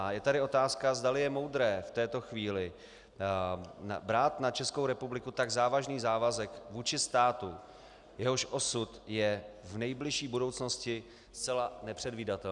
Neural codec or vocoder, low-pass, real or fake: none; 10.8 kHz; real